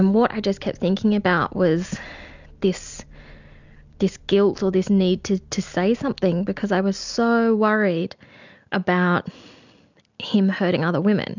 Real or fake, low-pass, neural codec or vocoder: real; 7.2 kHz; none